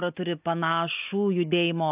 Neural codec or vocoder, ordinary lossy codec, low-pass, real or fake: none; AAC, 32 kbps; 3.6 kHz; real